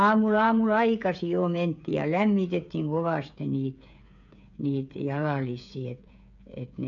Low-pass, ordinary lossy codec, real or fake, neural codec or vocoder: 7.2 kHz; none; fake; codec, 16 kHz, 8 kbps, FreqCodec, smaller model